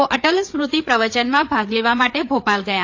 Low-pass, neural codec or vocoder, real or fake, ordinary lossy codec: 7.2 kHz; codec, 16 kHz, 4 kbps, FreqCodec, larger model; fake; AAC, 48 kbps